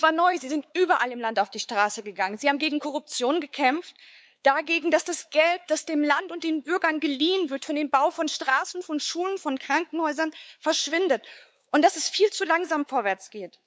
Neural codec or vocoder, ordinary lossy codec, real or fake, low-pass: codec, 16 kHz, 4 kbps, X-Codec, WavLM features, trained on Multilingual LibriSpeech; none; fake; none